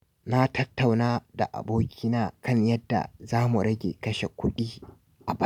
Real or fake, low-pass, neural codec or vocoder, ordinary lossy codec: real; 19.8 kHz; none; none